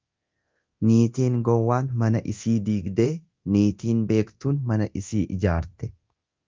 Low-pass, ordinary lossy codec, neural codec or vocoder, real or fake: 7.2 kHz; Opus, 24 kbps; codec, 24 kHz, 0.9 kbps, DualCodec; fake